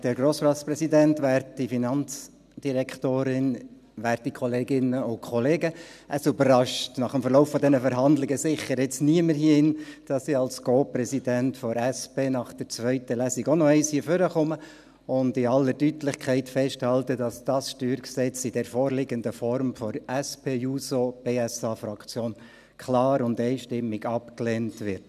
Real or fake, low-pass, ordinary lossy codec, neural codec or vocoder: real; 14.4 kHz; none; none